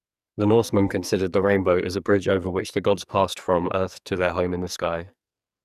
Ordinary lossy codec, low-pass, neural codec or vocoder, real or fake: none; 14.4 kHz; codec, 44.1 kHz, 2.6 kbps, SNAC; fake